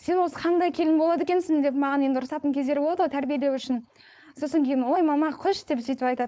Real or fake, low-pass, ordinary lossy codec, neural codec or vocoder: fake; none; none; codec, 16 kHz, 4.8 kbps, FACodec